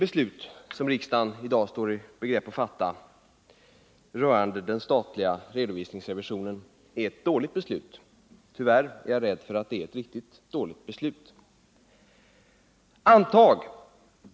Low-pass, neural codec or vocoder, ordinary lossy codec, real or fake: none; none; none; real